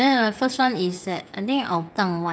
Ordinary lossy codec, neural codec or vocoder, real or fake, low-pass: none; codec, 16 kHz, 8 kbps, FreqCodec, smaller model; fake; none